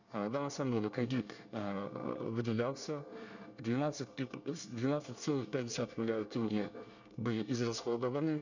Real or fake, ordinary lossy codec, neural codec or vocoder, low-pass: fake; none; codec, 24 kHz, 1 kbps, SNAC; 7.2 kHz